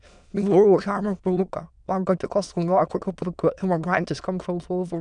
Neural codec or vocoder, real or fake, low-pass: autoencoder, 22.05 kHz, a latent of 192 numbers a frame, VITS, trained on many speakers; fake; 9.9 kHz